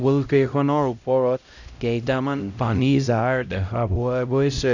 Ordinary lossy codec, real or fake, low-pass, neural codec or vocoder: none; fake; 7.2 kHz; codec, 16 kHz, 0.5 kbps, X-Codec, HuBERT features, trained on LibriSpeech